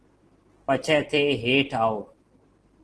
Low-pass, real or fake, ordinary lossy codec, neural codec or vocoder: 10.8 kHz; real; Opus, 16 kbps; none